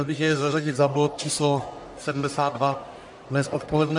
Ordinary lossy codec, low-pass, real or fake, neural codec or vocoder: MP3, 64 kbps; 10.8 kHz; fake; codec, 44.1 kHz, 1.7 kbps, Pupu-Codec